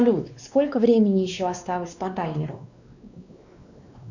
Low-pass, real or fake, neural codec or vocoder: 7.2 kHz; fake; codec, 16 kHz, 2 kbps, X-Codec, WavLM features, trained on Multilingual LibriSpeech